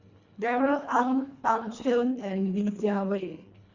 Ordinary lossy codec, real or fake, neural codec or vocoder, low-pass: Opus, 64 kbps; fake; codec, 24 kHz, 1.5 kbps, HILCodec; 7.2 kHz